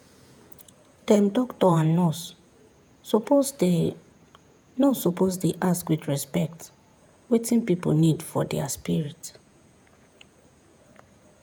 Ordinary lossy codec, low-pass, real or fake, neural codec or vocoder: none; 19.8 kHz; fake; vocoder, 48 kHz, 128 mel bands, Vocos